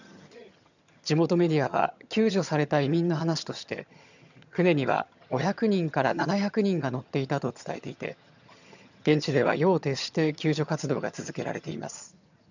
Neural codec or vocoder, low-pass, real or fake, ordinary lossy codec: vocoder, 22.05 kHz, 80 mel bands, HiFi-GAN; 7.2 kHz; fake; none